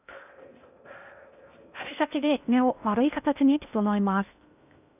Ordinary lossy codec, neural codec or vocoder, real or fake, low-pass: none; codec, 16 kHz in and 24 kHz out, 0.6 kbps, FocalCodec, streaming, 2048 codes; fake; 3.6 kHz